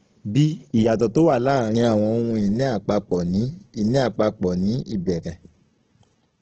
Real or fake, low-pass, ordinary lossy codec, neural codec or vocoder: fake; 7.2 kHz; Opus, 16 kbps; codec, 16 kHz, 16 kbps, FunCodec, trained on Chinese and English, 50 frames a second